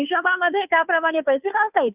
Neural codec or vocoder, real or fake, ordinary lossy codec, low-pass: codec, 16 kHz, 2 kbps, FunCodec, trained on Chinese and English, 25 frames a second; fake; none; 3.6 kHz